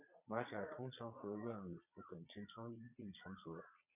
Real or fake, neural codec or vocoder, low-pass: fake; vocoder, 22.05 kHz, 80 mel bands, Vocos; 3.6 kHz